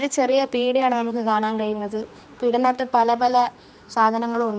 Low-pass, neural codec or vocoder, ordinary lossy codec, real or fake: none; codec, 16 kHz, 2 kbps, X-Codec, HuBERT features, trained on general audio; none; fake